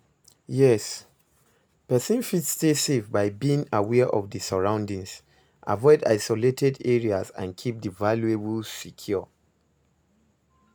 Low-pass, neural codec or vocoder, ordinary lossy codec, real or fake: none; none; none; real